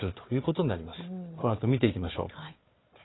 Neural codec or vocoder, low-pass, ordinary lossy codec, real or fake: codec, 16 kHz, 4 kbps, FunCodec, trained on Chinese and English, 50 frames a second; 7.2 kHz; AAC, 16 kbps; fake